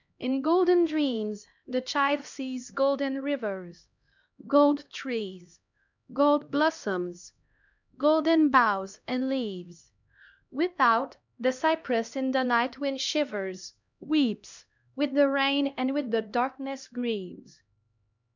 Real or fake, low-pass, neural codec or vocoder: fake; 7.2 kHz; codec, 16 kHz, 1 kbps, X-Codec, HuBERT features, trained on LibriSpeech